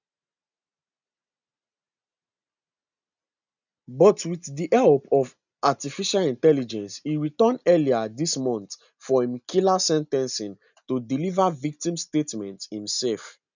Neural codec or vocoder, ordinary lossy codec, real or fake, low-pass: none; none; real; 7.2 kHz